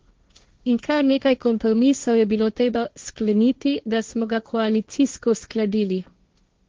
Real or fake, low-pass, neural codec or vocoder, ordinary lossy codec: fake; 7.2 kHz; codec, 16 kHz, 1.1 kbps, Voila-Tokenizer; Opus, 32 kbps